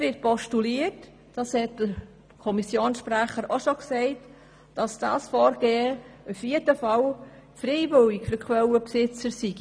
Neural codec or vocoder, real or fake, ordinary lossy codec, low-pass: none; real; none; none